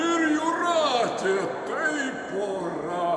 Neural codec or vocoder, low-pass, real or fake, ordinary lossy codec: none; 10.8 kHz; real; Opus, 64 kbps